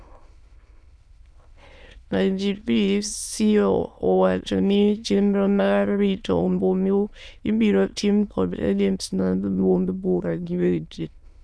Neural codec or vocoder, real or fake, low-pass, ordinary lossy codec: autoencoder, 22.05 kHz, a latent of 192 numbers a frame, VITS, trained on many speakers; fake; none; none